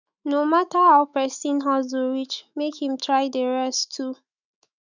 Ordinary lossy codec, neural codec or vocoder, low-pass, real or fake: none; autoencoder, 48 kHz, 128 numbers a frame, DAC-VAE, trained on Japanese speech; 7.2 kHz; fake